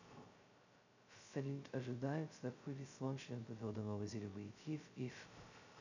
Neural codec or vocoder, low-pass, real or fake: codec, 16 kHz, 0.2 kbps, FocalCodec; 7.2 kHz; fake